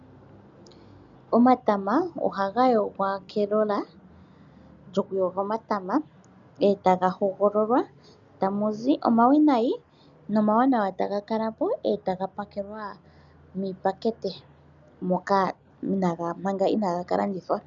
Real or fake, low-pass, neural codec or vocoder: real; 7.2 kHz; none